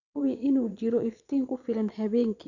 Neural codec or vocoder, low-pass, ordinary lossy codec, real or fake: vocoder, 22.05 kHz, 80 mel bands, Vocos; 7.2 kHz; none; fake